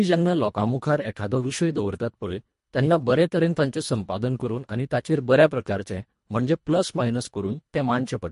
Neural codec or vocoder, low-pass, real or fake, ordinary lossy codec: codec, 24 kHz, 1.5 kbps, HILCodec; 10.8 kHz; fake; MP3, 48 kbps